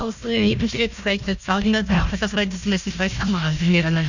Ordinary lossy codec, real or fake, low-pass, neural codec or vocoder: none; fake; 7.2 kHz; codec, 16 kHz, 1 kbps, FunCodec, trained on Chinese and English, 50 frames a second